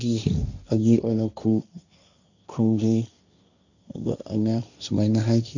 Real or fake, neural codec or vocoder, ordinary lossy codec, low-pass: fake; codec, 16 kHz, 1.1 kbps, Voila-Tokenizer; none; 7.2 kHz